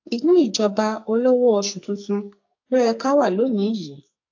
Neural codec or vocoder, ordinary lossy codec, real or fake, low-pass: codec, 44.1 kHz, 2.6 kbps, SNAC; AAC, 48 kbps; fake; 7.2 kHz